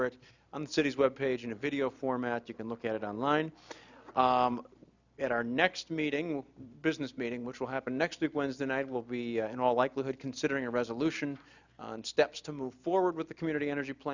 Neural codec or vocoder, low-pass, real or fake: none; 7.2 kHz; real